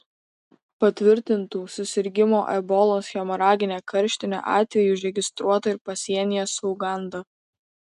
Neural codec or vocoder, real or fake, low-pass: none; real; 10.8 kHz